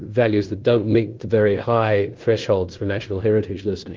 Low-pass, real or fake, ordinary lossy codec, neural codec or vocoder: 7.2 kHz; fake; Opus, 32 kbps; codec, 16 kHz in and 24 kHz out, 0.9 kbps, LongCat-Audio-Codec, fine tuned four codebook decoder